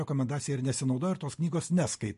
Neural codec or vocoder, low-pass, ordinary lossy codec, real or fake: none; 14.4 kHz; MP3, 48 kbps; real